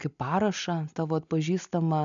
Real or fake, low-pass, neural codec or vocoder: real; 7.2 kHz; none